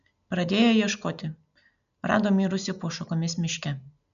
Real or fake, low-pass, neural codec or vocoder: real; 7.2 kHz; none